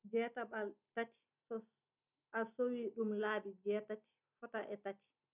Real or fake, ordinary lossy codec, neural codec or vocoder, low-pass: real; none; none; 3.6 kHz